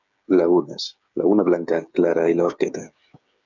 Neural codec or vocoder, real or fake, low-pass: codec, 16 kHz, 8 kbps, FreqCodec, smaller model; fake; 7.2 kHz